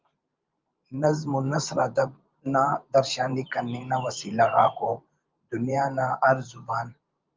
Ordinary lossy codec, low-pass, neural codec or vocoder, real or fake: Opus, 24 kbps; 7.2 kHz; vocoder, 44.1 kHz, 128 mel bands every 512 samples, BigVGAN v2; fake